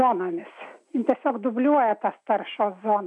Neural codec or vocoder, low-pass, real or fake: none; 10.8 kHz; real